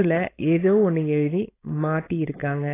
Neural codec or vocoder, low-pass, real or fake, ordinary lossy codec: codec, 16 kHz, 4.8 kbps, FACodec; 3.6 kHz; fake; AAC, 16 kbps